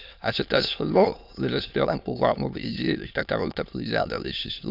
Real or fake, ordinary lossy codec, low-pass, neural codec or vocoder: fake; none; 5.4 kHz; autoencoder, 22.05 kHz, a latent of 192 numbers a frame, VITS, trained on many speakers